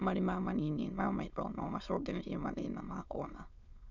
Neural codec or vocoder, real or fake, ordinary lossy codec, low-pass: autoencoder, 22.05 kHz, a latent of 192 numbers a frame, VITS, trained on many speakers; fake; none; 7.2 kHz